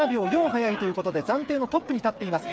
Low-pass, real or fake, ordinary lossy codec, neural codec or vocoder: none; fake; none; codec, 16 kHz, 8 kbps, FreqCodec, smaller model